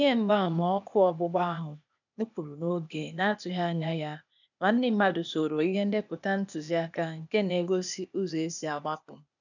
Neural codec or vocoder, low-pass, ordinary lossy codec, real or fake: codec, 16 kHz, 0.8 kbps, ZipCodec; 7.2 kHz; none; fake